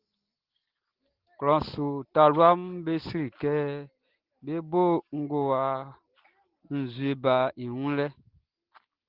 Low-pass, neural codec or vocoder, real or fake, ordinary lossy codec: 5.4 kHz; none; real; Opus, 16 kbps